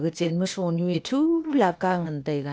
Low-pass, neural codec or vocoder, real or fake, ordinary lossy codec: none; codec, 16 kHz, 0.8 kbps, ZipCodec; fake; none